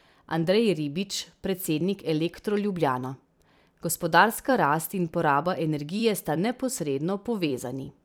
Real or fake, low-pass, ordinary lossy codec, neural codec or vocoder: fake; none; none; vocoder, 44.1 kHz, 128 mel bands every 256 samples, BigVGAN v2